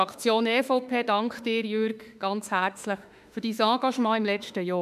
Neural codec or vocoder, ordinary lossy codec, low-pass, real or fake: autoencoder, 48 kHz, 32 numbers a frame, DAC-VAE, trained on Japanese speech; none; 14.4 kHz; fake